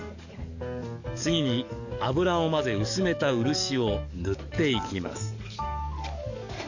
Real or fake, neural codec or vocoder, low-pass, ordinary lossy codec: fake; codec, 44.1 kHz, 7.8 kbps, DAC; 7.2 kHz; none